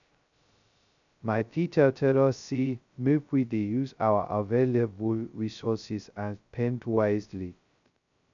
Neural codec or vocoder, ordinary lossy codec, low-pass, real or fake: codec, 16 kHz, 0.2 kbps, FocalCodec; none; 7.2 kHz; fake